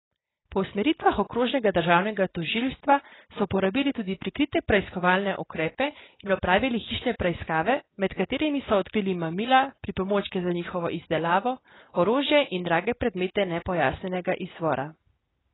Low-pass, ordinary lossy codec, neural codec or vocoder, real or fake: 7.2 kHz; AAC, 16 kbps; none; real